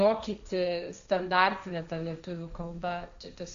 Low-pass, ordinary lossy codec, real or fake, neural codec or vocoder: 7.2 kHz; MP3, 64 kbps; fake; codec, 16 kHz, 1.1 kbps, Voila-Tokenizer